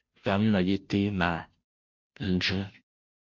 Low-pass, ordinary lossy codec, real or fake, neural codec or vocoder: 7.2 kHz; MP3, 64 kbps; fake; codec, 16 kHz, 0.5 kbps, FunCodec, trained on Chinese and English, 25 frames a second